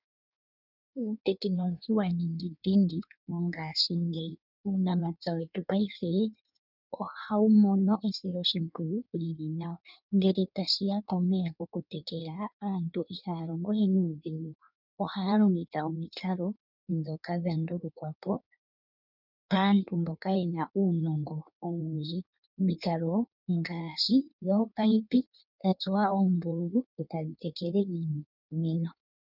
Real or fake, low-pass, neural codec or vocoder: fake; 5.4 kHz; codec, 16 kHz in and 24 kHz out, 1.1 kbps, FireRedTTS-2 codec